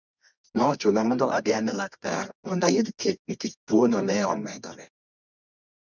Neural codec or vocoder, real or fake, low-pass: codec, 24 kHz, 0.9 kbps, WavTokenizer, medium music audio release; fake; 7.2 kHz